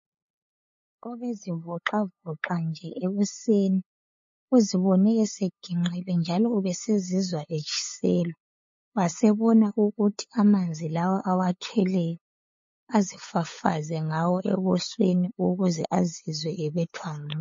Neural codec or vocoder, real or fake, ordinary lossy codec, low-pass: codec, 16 kHz, 8 kbps, FunCodec, trained on LibriTTS, 25 frames a second; fake; MP3, 32 kbps; 7.2 kHz